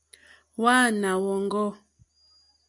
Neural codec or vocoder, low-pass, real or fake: none; 10.8 kHz; real